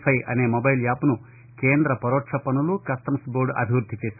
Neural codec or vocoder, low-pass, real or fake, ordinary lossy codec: none; 3.6 kHz; real; none